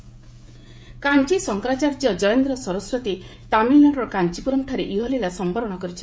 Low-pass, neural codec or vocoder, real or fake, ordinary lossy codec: none; codec, 16 kHz, 16 kbps, FreqCodec, smaller model; fake; none